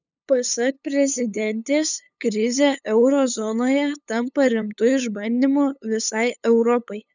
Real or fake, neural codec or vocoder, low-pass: fake; codec, 16 kHz, 8 kbps, FunCodec, trained on LibriTTS, 25 frames a second; 7.2 kHz